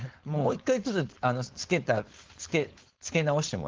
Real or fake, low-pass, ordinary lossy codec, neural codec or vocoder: fake; 7.2 kHz; Opus, 16 kbps; codec, 16 kHz, 4.8 kbps, FACodec